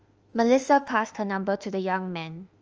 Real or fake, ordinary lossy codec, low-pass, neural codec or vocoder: fake; Opus, 24 kbps; 7.2 kHz; autoencoder, 48 kHz, 32 numbers a frame, DAC-VAE, trained on Japanese speech